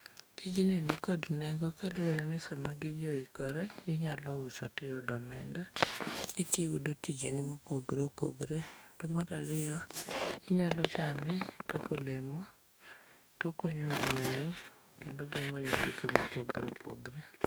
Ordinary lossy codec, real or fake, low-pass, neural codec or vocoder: none; fake; none; codec, 44.1 kHz, 2.6 kbps, DAC